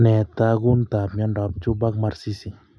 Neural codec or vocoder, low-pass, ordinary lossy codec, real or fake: none; none; none; real